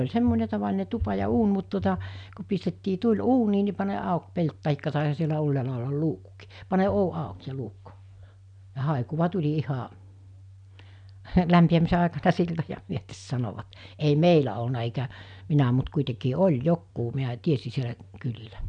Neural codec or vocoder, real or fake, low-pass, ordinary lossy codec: none; real; 9.9 kHz; none